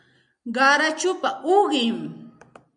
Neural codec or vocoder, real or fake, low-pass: none; real; 9.9 kHz